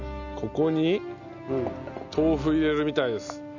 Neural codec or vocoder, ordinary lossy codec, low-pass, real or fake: none; none; 7.2 kHz; real